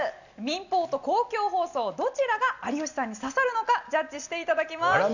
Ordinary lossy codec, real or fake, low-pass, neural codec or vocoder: none; real; 7.2 kHz; none